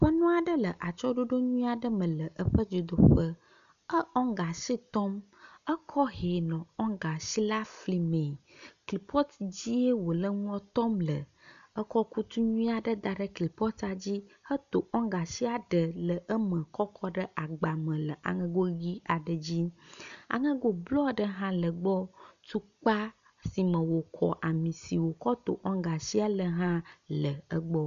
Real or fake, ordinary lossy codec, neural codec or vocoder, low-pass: real; MP3, 96 kbps; none; 7.2 kHz